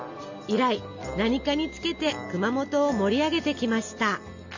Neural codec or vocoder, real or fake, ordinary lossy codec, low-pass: none; real; none; 7.2 kHz